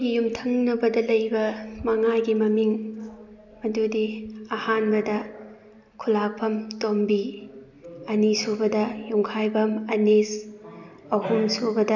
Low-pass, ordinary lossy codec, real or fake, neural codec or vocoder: 7.2 kHz; none; real; none